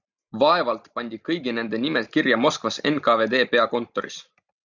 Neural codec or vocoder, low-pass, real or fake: none; 7.2 kHz; real